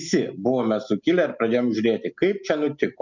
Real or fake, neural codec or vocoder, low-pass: real; none; 7.2 kHz